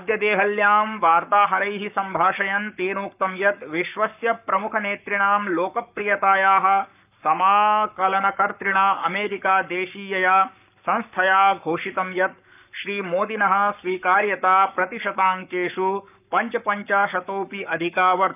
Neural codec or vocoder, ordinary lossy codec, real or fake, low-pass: codec, 44.1 kHz, 7.8 kbps, Pupu-Codec; none; fake; 3.6 kHz